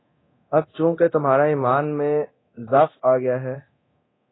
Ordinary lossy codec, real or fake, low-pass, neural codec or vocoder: AAC, 16 kbps; fake; 7.2 kHz; codec, 24 kHz, 0.9 kbps, DualCodec